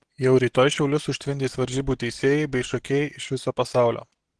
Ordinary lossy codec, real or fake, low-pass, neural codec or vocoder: Opus, 16 kbps; real; 10.8 kHz; none